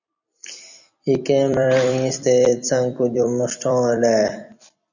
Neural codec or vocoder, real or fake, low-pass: vocoder, 44.1 kHz, 128 mel bands every 256 samples, BigVGAN v2; fake; 7.2 kHz